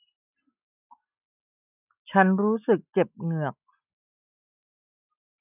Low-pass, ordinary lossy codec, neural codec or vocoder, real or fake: 3.6 kHz; none; none; real